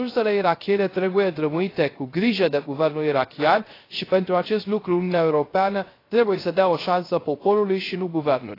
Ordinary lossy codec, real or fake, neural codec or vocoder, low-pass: AAC, 24 kbps; fake; codec, 16 kHz, 0.3 kbps, FocalCodec; 5.4 kHz